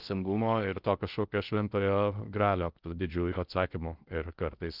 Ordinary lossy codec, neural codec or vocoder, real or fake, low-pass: Opus, 32 kbps; codec, 16 kHz in and 24 kHz out, 0.6 kbps, FocalCodec, streaming, 4096 codes; fake; 5.4 kHz